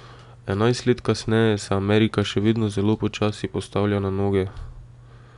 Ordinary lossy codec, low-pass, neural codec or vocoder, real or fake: none; 10.8 kHz; none; real